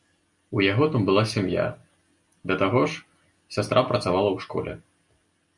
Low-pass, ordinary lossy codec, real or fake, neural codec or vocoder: 10.8 kHz; MP3, 96 kbps; real; none